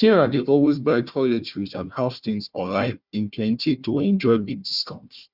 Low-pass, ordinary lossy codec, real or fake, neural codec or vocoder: 5.4 kHz; Opus, 64 kbps; fake; codec, 16 kHz, 1 kbps, FunCodec, trained on Chinese and English, 50 frames a second